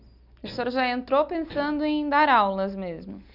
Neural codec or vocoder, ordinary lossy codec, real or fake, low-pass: none; none; real; 5.4 kHz